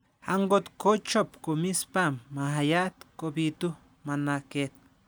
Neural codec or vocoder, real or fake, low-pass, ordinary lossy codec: none; real; none; none